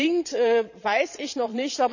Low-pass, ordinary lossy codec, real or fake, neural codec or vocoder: 7.2 kHz; none; fake; vocoder, 22.05 kHz, 80 mel bands, Vocos